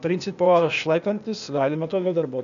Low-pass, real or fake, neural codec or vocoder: 7.2 kHz; fake; codec, 16 kHz, 0.8 kbps, ZipCodec